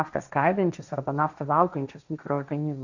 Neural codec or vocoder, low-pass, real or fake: codec, 16 kHz, 1.1 kbps, Voila-Tokenizer; 7.2 kHz; fake